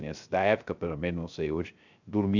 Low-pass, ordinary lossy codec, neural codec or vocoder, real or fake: 7.2 kHz; none; codec, 16 kHz, 0.3 kbps, FocalCodec; fake